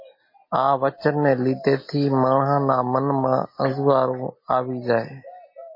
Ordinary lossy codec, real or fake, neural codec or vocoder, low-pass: MP3, 24 kbps; real; none; 5.4 kHz